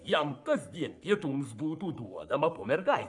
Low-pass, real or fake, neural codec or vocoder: 10.8 kHz; fake; codec, 44.1 kHz, 3.4 kbps, Pupu-Codec